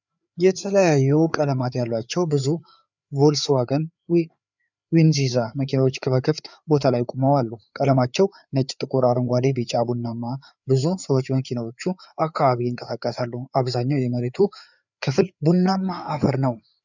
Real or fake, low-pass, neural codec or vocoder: fake; 7.2 kHz; codec, 16 kHz, 4 kbps, FreqCodec, larger model